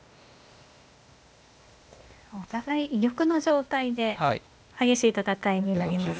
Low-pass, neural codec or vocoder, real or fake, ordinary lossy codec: none; codec, 16 kHz, 0.8 kbps, ZipCodec; fake; none